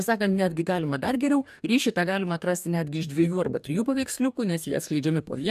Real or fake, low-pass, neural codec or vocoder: fake; 14.4 kHz; codec, 44.1 kHz, 2.6 kbps, DAC